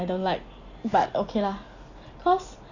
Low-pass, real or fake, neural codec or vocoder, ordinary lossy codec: 7.2 kHz; real; none; Opus, 64 kbps